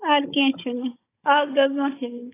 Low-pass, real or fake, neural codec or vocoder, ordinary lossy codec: 3.6 kHz; fake; codec, 16 kHz, 16 kbps, FunCodec, trained on Chinese and English, 50 frames a second; AAC, 16 kbps